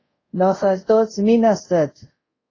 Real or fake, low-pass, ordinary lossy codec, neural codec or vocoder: fake; 7.2 kHz; AAC, 32 kbps; codec, 24 kHz, 0.5 kbps, DualCodec